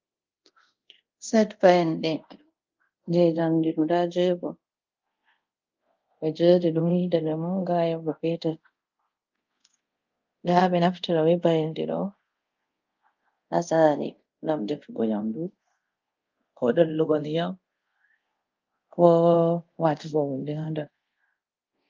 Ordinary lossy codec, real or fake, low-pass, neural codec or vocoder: Opus, 32 kbps; fake; 7.2 kHz; codec, 24 kHz, 0.5 kbps, DualCodec